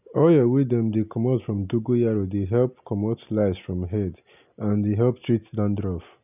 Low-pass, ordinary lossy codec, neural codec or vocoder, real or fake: 3.6 kHz; none; none; real